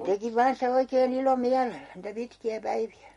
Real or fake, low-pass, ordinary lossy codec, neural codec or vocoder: real; 19.8 kHz; MP3, 48 kbps; none